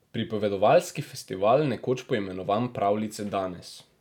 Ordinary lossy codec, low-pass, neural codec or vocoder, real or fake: none; 19.8 kHz; none; real